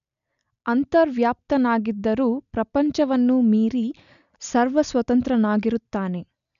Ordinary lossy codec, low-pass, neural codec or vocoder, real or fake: none; 7.2 kHz; none; real